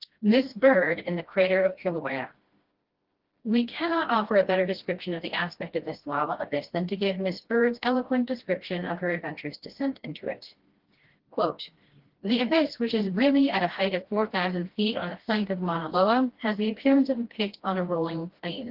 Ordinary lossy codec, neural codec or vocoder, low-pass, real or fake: Opus, 16 kbps; codec, 16 kHz, 1 kbps, FreqCodec, smaller model; 5.4 kHz; fake